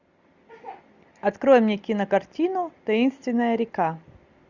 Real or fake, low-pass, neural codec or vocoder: real; 7.2 kHz; none